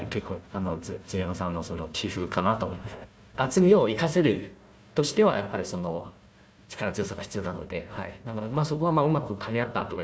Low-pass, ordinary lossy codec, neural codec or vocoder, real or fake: none; none; codec, 16 kHz, 1 kbps, FunCodec, trained on Chinese and English, 50 frames a second; fake